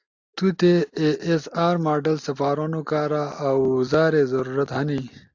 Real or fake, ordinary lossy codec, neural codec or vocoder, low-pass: real; AAC, 48 kbps; none; 7.2 kHz